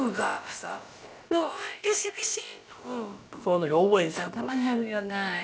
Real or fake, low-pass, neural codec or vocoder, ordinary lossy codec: fake; none; codec, 16 kHz, about 1 kbps, DyCAST, with the encoder's durations; none